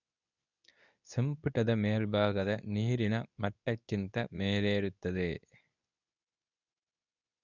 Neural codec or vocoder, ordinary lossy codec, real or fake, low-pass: codec, 24 kHz, 0.9 kbps, WavTokenizer, medium speech release version 2; none; fake; 7.2 kHz